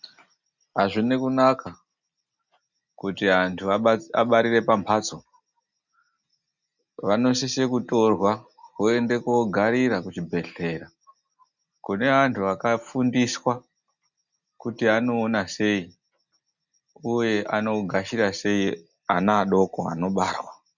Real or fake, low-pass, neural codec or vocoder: real; 7.2 kHz; none